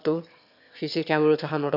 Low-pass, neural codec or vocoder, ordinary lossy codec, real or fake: 5.4 kHz; autoencoder, 22.05 kHz, a latent of 192 numbers a frame, VITS, trained on one speaker; none; fake